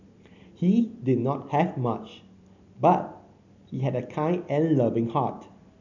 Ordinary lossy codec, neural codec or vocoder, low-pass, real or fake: none; none; 7.2 kHz; real